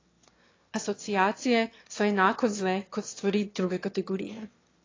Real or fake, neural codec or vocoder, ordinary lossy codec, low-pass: fake; autoencoder, 22.05 kHz, a latent of 192 numbers a frame, VITS, trained on one speaker; AAC, 32 kbps; 7.2 kHz